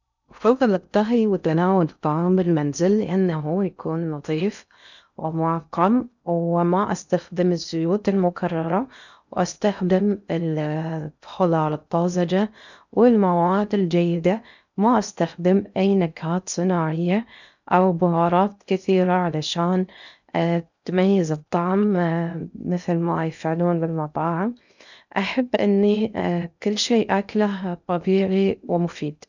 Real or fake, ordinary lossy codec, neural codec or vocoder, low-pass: fake; none; codec, 16 kHz in and 24 kHz out, 0.6 kbps, FocalCodec, streaming, 2048 codes; 7.2 kHz